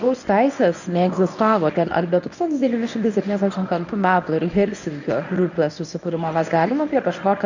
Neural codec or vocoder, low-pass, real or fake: codec, 24 kHz, 0.9 kbps, WavTokenizer, medium speech release version 1; 7.2 kHz; fake